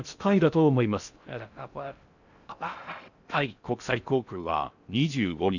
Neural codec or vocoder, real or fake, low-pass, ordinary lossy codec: codec, 16 kHz in and 24 kHz out, 0.6 kbps, FocalCodec, streaming, 2048 codes; fake; 7.2 kHz; none